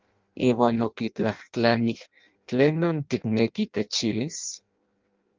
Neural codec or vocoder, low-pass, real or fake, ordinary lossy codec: codec, 16 kHz in and 24 kHz out, 0.6 kbps, FireRedTTS-2 codec; 7.2 kHz; fake; Opus, 32 kbps